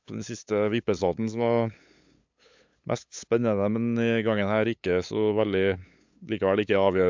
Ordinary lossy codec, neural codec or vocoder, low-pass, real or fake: MP3, 64 kbps; codec, 16 kHz, 8 kbps, FunCodec, trained on LibriTTS, 25 frames a second; 7.2 kHz; fake